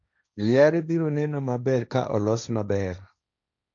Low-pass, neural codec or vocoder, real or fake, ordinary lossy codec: 7.2 kHz; codec, 16 kHz, 1.1 kbps, Voila-Tokenizer; fake; none